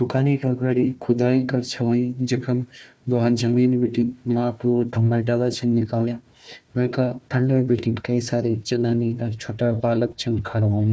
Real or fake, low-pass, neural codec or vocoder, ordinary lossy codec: fake; none; codec, 16 kHz, 1 kbps, FunCodec, trained on Chinese and English, 50 frames a second; none